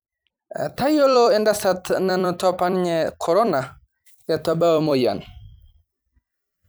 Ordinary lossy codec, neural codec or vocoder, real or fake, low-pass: none; vocoder, 44.1 kHz, 128 mel bands every 256 samples, BigVGAN v2; fake; none